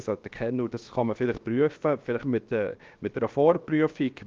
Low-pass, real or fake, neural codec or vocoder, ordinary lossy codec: 7.2 kHz; fake; codec, 16 kHz, 0.7 kbps, FocalCodec; Opus, 32 kbps